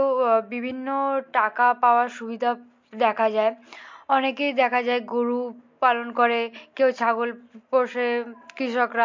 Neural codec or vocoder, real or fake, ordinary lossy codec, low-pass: none; real; MP3, 48 kbps; 7.2 kHz